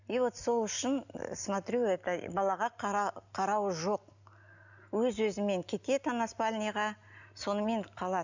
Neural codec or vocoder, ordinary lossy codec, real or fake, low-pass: none; none; real; 7.2 kHz